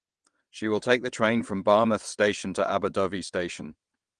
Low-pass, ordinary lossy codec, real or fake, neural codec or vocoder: 9.9 kHz; Opus, 24 kbps; fake; vocoder, 22.05 kHz, 80 mel bands, WaveNeXt